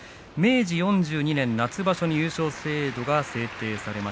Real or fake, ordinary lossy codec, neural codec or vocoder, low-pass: real; none; none; none